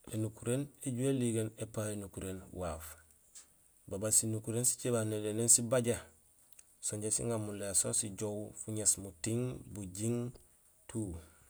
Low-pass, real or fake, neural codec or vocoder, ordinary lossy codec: none; real; none; none